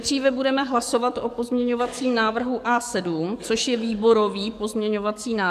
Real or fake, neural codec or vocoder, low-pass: fake; codec, 44.1 kHz, 7.8 kbps, DAC; 14.4 kHz